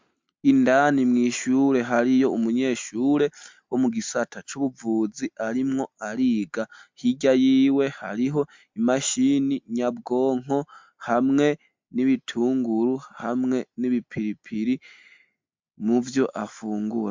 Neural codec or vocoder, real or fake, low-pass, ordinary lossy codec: none; real; 7.2 kHz; MP3, 64 kbps